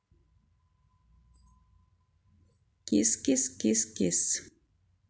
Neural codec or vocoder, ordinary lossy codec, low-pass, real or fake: none; none; none; real